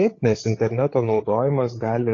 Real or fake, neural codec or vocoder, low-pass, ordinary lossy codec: fake; codec, 16 kHz, 8 kbps, FreqCodec, larger model; 7.2 kHz; AAC, 32 kbps